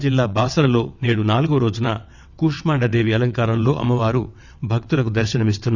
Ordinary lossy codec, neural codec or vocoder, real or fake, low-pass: none; vocoder, 22.05 kHz, 80 mel bands, WaveNeXt; fake; 7.2 kHz